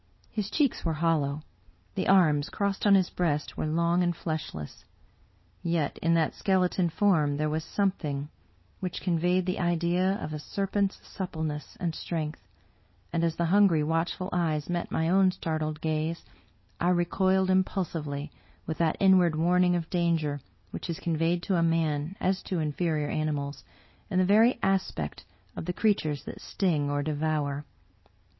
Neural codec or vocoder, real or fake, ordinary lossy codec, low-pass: none; real; MP3, 24 kbps; 7.2 kHz